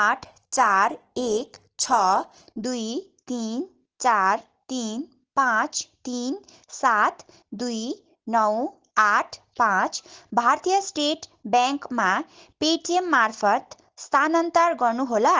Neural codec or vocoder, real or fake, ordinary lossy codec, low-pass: none; real; Opus, 16 kbps; 7.2 kHz